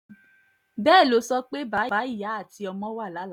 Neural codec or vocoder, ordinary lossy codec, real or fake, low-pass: none; none; real; 19.8 kHz